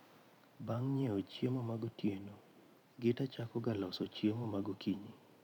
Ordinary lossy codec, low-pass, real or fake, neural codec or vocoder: none; 19.8 kHz; fake; vocoder, 44.1 kHz, 128 mel bands every 512 samples, BigVGAN v2